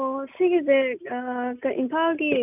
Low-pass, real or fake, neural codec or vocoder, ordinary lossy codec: 3.6 kHz; real; none; none